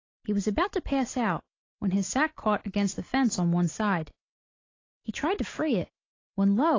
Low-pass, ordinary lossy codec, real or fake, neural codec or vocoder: 7.2 kHz; AAC, 32 kbps; real; none